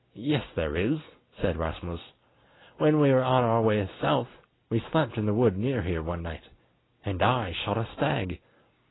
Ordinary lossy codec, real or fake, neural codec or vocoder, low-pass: AAC, 16 kbps; real; none; 7.2 kHz